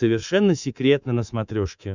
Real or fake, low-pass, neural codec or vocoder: fake; 7.2 kHz; codec, 24 kHz, 3.1 kbps, DualCodec